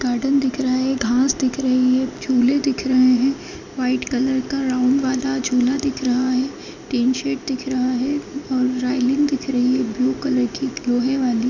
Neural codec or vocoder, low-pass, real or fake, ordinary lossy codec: none; 7.2 kHz; real; none